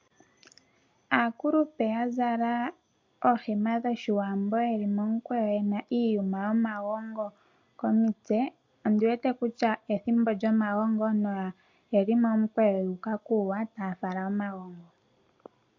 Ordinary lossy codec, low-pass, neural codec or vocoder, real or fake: MP3, 48 kbps; 7.2 kHz; none; real